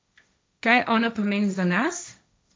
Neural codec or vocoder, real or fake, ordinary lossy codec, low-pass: codec, 16 kHz, 1.1 kbps, Voila-Tokenizer; fake; none; none